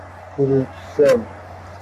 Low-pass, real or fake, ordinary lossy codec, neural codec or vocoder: 14.4 kHz; fake; AAC, 96 kbps; codec, 44.1 kHz, 3.4 kbps, Pupu-Codec